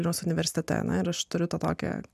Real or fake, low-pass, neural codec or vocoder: real; 14.4 kHz; none